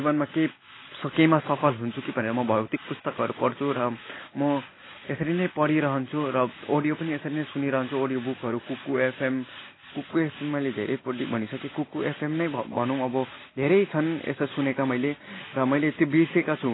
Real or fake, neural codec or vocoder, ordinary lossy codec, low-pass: real; none; AAC, 16 kbps; 7.2 kHz